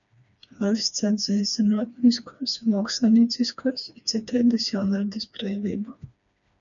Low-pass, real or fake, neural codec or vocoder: 7.2 kHz; fake; codec, 16 kHz, 2 kbps, FreqCodec, smaller model